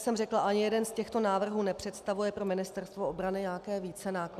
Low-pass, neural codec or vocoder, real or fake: 14.4 kHz; none; real